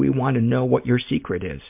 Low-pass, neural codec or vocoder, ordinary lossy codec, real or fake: 3.6 kHz; none; MP3, 32 kbps; real